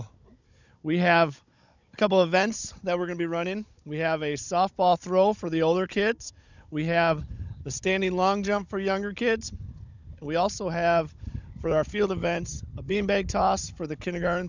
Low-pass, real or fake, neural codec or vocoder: 7.2 kHz; fake; codec, 16 kHz, 16 kbps, FunCodec, trained on Chinese and English, 50 frames a second